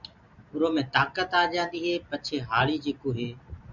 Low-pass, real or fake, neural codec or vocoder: 7.2 kHz; real; none